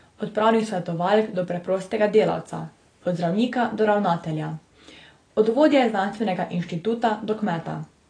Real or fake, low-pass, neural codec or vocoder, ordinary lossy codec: real; 9.9 kHz; none; AAC, 32 kbps